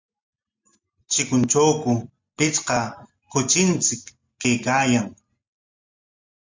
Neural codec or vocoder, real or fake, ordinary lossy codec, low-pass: none; real; MP3, 64 kbps; 7.2 kHz